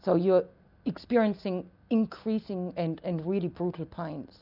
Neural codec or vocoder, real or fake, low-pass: none; real; 5.4 kHz